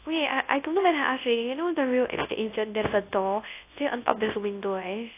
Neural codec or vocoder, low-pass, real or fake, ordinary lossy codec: codec, 24 kHz, 0.9 kbps, WavTokenizer, large speech release; 3.6 kHz; fake; AAC, 24 kbps